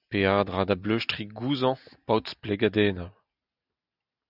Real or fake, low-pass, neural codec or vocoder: real; 5.4 kHz; none